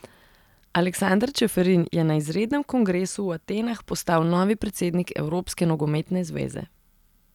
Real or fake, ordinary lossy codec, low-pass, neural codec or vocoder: real; none; 19.8 kHz; none